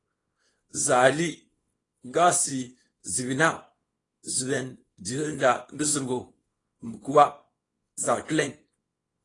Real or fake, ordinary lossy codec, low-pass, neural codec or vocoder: fake; AAC, 32 kbps; 10.8 kHz; codec, 24 kHz, 0.9 kbps, WavTokenizer, small release